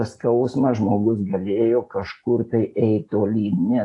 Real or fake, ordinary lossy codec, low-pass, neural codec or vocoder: real; AAC, 48 kbps; 10.8 kHz; none